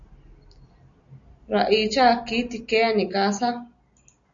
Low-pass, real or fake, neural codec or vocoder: 7.2 kHz; real; none